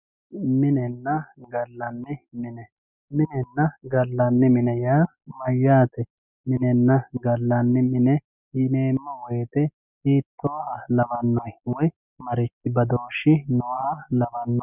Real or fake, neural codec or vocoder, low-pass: real; none; 3.6 kHz